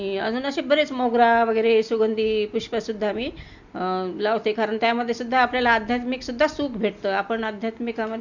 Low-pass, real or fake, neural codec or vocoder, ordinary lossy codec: 7.2 kHz; real; none; none